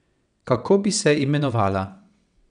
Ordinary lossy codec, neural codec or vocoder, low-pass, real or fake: none; vocoder, 22.05 kHz, 80 mel bands, WaveNeXt; 9.9 kHz; fake